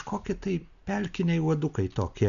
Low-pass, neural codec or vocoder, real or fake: 7.2 kHz; none; real